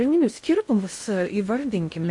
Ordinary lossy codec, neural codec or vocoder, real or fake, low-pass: MP3, 48 kbps; codec, 16 kHz in and 24 kHz out, 0.6 kbps, FocalCodec, streaming, 2048 codes; fake; 10.8 kHz